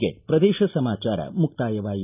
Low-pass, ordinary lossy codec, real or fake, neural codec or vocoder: 3.6 kHz; none; real; none